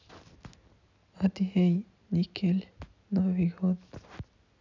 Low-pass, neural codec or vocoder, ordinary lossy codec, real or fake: 7.2 kHz; none; none; real